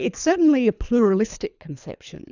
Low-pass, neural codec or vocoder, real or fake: 7.2 kHz; codec, 24 kHz, 3 kbps, HILCodec; fake